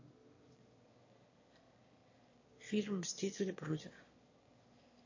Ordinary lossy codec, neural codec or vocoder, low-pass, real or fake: MP3, 32 kbps; autoencoder, 22.05 kHz, a latent of 192 numbers a frame, VITS, trained on one speaker; 7.2 kHz; fake